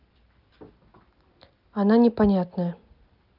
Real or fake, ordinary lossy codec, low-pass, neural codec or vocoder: real; Opus, 32 kbps; 5.4 kHz; none